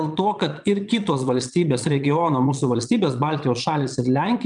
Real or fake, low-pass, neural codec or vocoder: fake; 9.9 kHz; vocoder, 22.05 kHz, 80 mel bands, Vocos